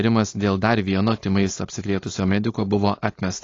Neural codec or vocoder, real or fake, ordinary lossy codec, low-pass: codec, 16 kHz, 4.8 kbps, FACodec; fake; AAC, 32 kbps; 7.2 kHz